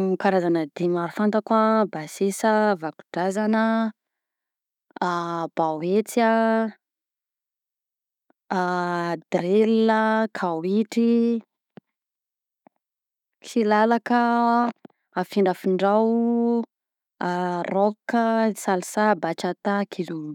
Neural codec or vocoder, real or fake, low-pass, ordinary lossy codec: autoencoder, 48 kHz, 128 numbers a frame, DAC-VAE, trained on Japanese speech; fake; 19.8 kHz; none